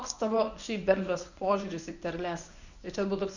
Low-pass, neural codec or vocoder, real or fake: 7.2 kHz; codec, 24 kHz, 0.9 kbps, WavTokenizer, medium speech release version 1; fake